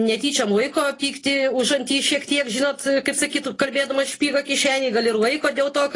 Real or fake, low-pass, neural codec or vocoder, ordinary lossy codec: real; 10.8 kHz; none; AAC, 32 kbps